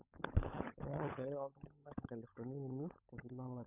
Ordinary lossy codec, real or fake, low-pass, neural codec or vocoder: none; fake; 3.6 kHz; codec, 16 kHz, 16 kbps, FunCodec, trained on LibriTTS, 50 frames a second